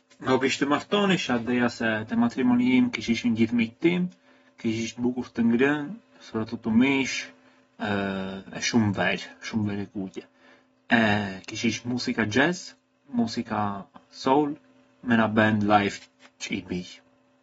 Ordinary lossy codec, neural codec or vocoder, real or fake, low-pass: AAC, 24 kbps; none; real; 19.8 kHz